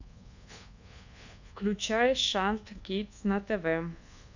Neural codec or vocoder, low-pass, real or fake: codec, 24 kHz, 1.2 kbps, DualCodec; 7.2 kHz; fake